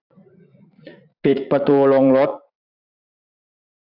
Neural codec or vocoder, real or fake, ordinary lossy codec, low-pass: vocoder, 44.1 kHz, 80 mel bands, Vocos; fake; AAC, 48 kbps; 5.4 kHz